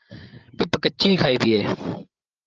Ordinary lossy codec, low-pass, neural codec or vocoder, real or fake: Opus, 24 kbps; 7.2 kHz; codec, 16 kHz, 8 kbps, FreqCodec, larger model; fake